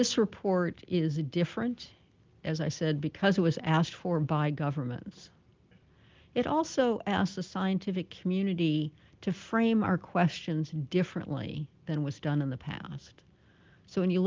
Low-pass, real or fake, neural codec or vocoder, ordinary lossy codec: 7.2 kHz; real; none; Opus, 32 kbps